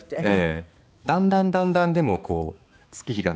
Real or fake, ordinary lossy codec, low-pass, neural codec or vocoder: fake; none; none; codec, 16 kHz, 2 kbps, X-Codec, HuBERT features, trained on balanced general audio